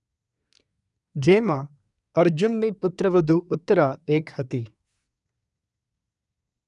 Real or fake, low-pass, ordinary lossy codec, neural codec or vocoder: fake; 10.8 kHz; none; codec, 24 kHz, 1 kbps, SNAC